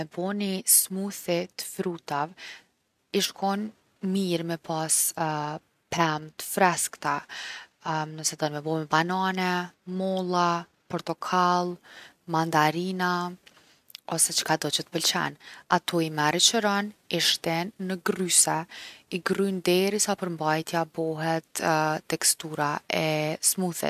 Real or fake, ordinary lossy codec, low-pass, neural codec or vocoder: real; none; 14.4 kHz; none